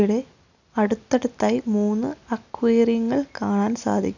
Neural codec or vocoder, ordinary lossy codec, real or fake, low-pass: none; none; real; 7.2 kHz